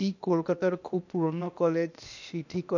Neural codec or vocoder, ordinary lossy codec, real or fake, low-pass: codec, 16 kHz, 0.8 kbps, ZipCodec; none; fake; 7.2 kHz